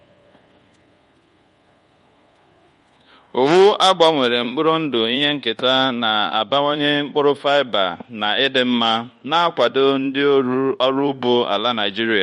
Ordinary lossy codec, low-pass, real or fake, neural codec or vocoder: MP3, 48 kbps; 10.8 kHz; fake; codec, 24 kHz, 1.2 kbps, DualCodec